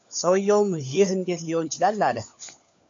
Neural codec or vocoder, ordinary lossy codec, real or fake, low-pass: codec, 16 kHz, 4 kbps, FunCodec, trained on LibriTTS, 50 frames a second; AAC, 64 kbps; fake; 7.2 kHz